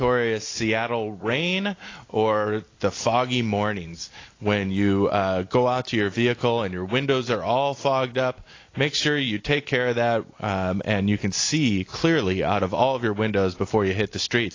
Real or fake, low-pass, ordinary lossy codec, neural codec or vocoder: real; 7.2 kHz; AAC, 32 kbps; none